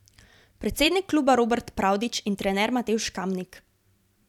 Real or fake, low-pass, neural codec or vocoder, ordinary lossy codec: fake; 19.8 kHz; vocoder, 44.1 kHz, 128 mel bands every 256 samples, BigVGAN v2; none